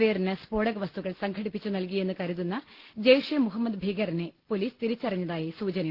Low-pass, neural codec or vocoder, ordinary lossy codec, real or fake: 5.4 kHz; none; Opus, 16 kbps; real